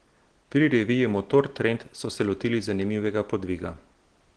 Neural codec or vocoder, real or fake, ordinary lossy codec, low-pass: none; real; Opus, 16 kbps; 9.9 kHz